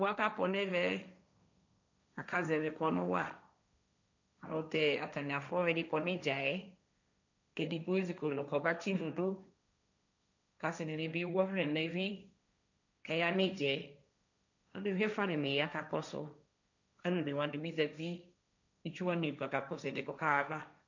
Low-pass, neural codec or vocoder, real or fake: 7.2 kHz; codec, 16 kHz, 1.1 kbps, Voila-Tokenizer; fake